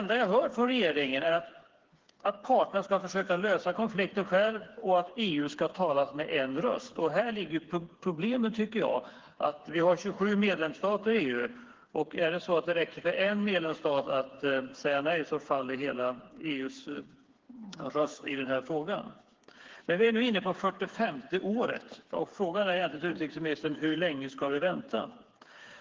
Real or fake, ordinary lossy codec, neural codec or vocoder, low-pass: fake; Opus, 16 kbps; codec, 16 kHz, 4 kbps, FreqCodec, smaller model; 7.2 kHz